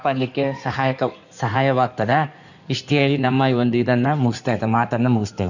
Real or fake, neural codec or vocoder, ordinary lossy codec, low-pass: fake; codec, 16 kHz in and 24 kHz out, 2.2 kbps, FireRedTTS-2 codec; none; 7.2 kHz